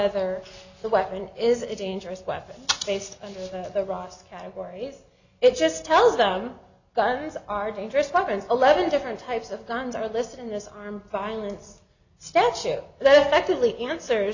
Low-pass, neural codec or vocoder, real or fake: 7.2 kHz; none; real